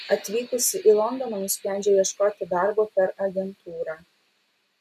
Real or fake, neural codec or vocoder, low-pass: real; none; 14.4 kHz